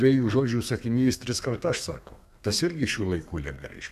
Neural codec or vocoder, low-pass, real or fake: codec, 32 kHz, 1.9 kbps, SNAC; 14.4 kHz; fake